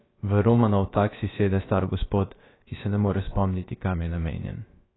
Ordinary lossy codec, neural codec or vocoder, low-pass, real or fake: AAC, 16 kbps; codec, 16 kHz, about 1 kbps, DyCAST, with the encoder's durations; 7.2 kHz; fake